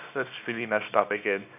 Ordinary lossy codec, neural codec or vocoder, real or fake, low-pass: none; codec, 24 kHz, 0.9 kbps, WavTokenizer, small release; fake; 3.6 kHz